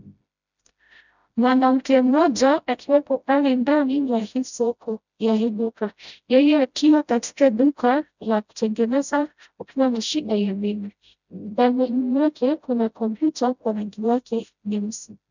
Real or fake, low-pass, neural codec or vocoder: fake; 7.2 kHz; codec, 16 kHz, 0.5 kbps, FreqCodec, smaller model